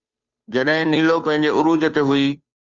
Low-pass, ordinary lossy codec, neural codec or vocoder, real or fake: 7.2 kHz; Opus, 32 kbps; codec, 16 kHz, 2 kbps, FunCodec, trained on Chinese and English, 25 frames a second; fake